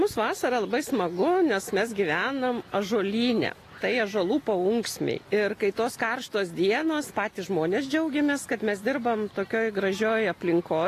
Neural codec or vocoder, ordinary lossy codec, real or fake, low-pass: none; AAC, 48 kbps; real; 14.4 kHz